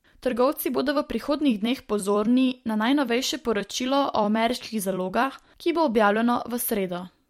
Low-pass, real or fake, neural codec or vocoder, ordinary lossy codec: 19.8 kHz; fake; vocoder, 44.1 kHz, 128 mel bands every 256 samples, BigVGAN v2; MP3, 64 kbps